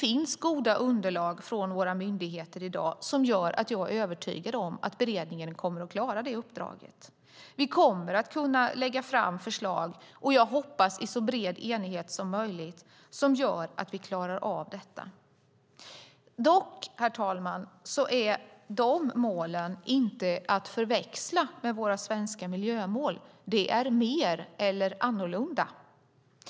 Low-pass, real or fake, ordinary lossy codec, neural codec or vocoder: none; real; none; none